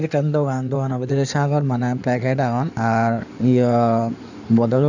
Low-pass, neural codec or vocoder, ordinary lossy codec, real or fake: 7.2 kHz; codec, 16 kHz in and 24 kHz out, 2.2 kbps, FireRedTTS-2 codec; none; fake